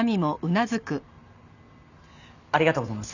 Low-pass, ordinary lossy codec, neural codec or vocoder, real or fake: 7.2 kHz; none; none; real